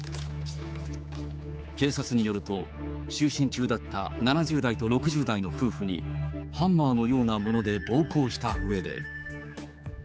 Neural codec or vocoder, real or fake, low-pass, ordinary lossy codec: codec, 16 kHz, 4 kbps, X-Codec, HuBERT features, trained on general audio; fake; none; none